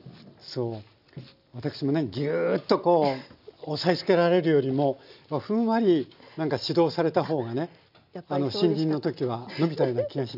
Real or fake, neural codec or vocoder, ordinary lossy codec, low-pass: real; none; none; 5.4 kHz